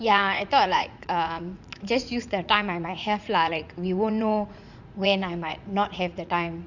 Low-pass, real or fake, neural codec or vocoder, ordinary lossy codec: 7.2 kHz; fake; vocoder, 44.1 kHz, 80 mel bands, Vocos; none